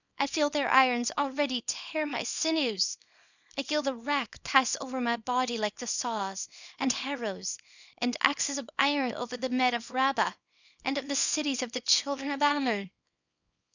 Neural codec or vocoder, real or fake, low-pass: codec, 24 kHz, 0.9 kbps, WavTokenizer, small release; fake; 7.2 kHz